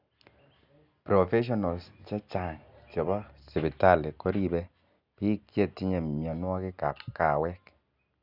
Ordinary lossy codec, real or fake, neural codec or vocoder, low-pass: none; real; none; 5.4 kHz